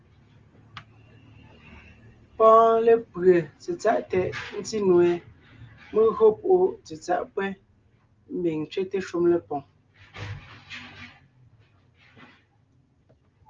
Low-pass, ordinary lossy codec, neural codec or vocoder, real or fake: 7.2 kHz; Opus, 32 kbps; none; real